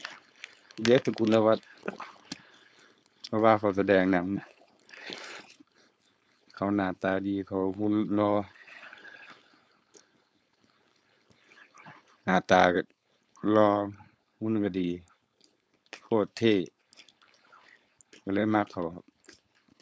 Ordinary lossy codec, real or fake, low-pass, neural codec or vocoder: none; fake; none; codec, 16 kHz, 4.8 kbps, FACodec